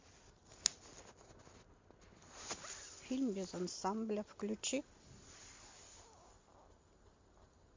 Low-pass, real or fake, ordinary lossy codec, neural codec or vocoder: 7.2 kHz; real; MP3, 48 kbps; none